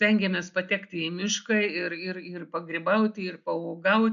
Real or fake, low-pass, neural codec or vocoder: real; 7.2 kHz; none